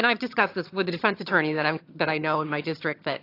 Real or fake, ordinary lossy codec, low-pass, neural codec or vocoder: fake; AAC, 32 kbps; 5.4 kHz; vocoder, 22.05 kHz, 80 mel bands, HiFi-GAN